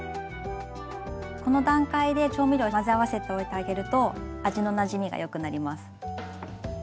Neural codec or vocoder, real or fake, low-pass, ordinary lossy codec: none; real; none; none